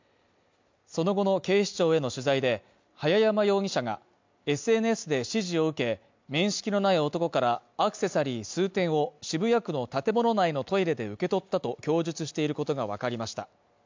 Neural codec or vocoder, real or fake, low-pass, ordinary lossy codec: none; real; 7.2 kHz; MP3, 64 kbps